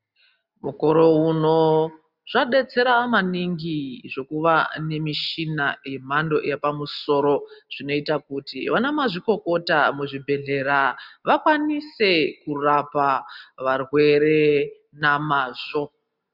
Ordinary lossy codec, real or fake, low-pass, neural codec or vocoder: Opus, 64 kbps; real; 5.4 kHz; none